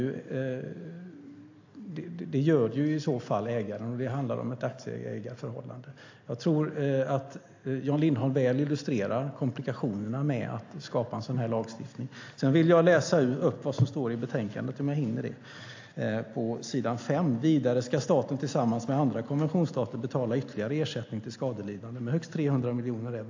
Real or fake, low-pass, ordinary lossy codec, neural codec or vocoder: real; 7.2 kHz; AAC, 48 kbps; none